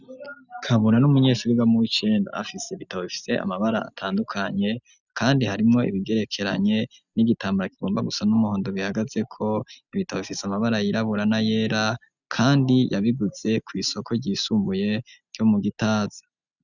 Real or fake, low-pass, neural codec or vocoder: real; 7.2 kHz; none